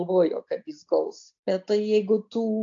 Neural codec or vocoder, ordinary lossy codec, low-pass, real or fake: none; AAC, 48 kbps; 7.2 kHz; real